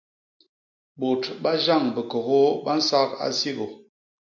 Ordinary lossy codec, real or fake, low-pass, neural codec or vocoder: MP3, 64 kbps; real; 7.2 kHz; none